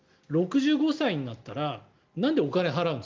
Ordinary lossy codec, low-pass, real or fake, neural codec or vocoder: Opus, 24 kbps; 7.2 kHz; real; none